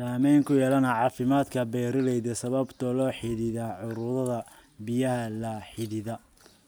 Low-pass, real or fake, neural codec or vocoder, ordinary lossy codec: none; real; none; none